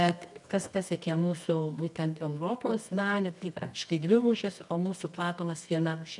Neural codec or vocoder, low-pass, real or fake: codec, 24 kHz, 0.9 kbps, WavTokenizer, medium music audio release; 10.8 kHz; fake